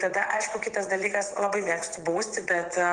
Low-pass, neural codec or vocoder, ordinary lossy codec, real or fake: 9.9 kHz; vocoder, 22.05 kHz, 80 mel bands, WaveNeXt; Opus, 24 kbps; fake